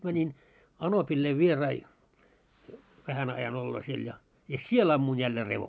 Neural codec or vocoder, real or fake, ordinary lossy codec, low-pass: none; real; none; none